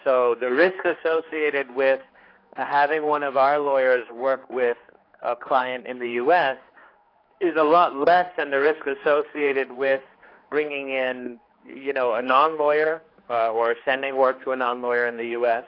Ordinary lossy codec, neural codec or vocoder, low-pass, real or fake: AAC, 32 kbps; codec, 16 kHz, 4 kbps, X-Codec, HuBERT features, trained on general audio; 5.4 kHz; fake